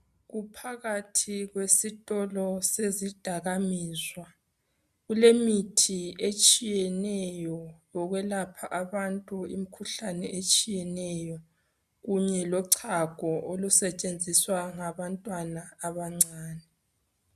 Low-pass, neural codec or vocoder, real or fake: 14.4 kHz; none; real